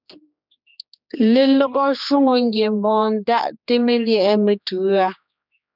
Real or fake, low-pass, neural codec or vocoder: fake; 5.4 kHz; codec, 16 kHz, 2 kbps, X-Codec, HuBERT features, trained on general audio